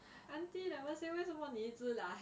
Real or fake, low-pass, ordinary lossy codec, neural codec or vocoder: real; none; none; none